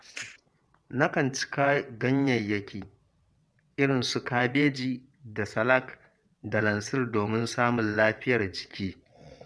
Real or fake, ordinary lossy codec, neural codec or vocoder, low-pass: fake; none; vocoder, 22.05 kHz, 80 mel bands, WaveNeXt; none